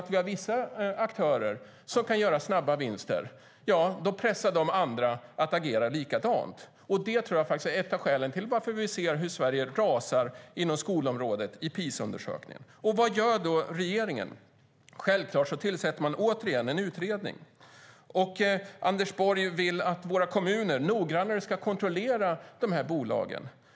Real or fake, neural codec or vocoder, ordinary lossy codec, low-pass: real; none; none; none